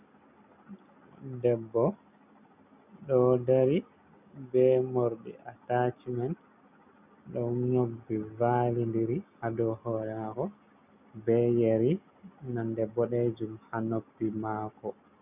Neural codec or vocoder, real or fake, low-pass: none; real; 3.6 kHz